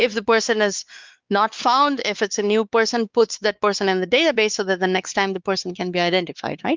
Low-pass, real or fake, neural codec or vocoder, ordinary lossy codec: 7.2 kHz; fake; codec, 16 kHz, 4 kbps, X-Codec, WavLM features, trained on Multilingual LibriSpeech; Opus, 32 kbps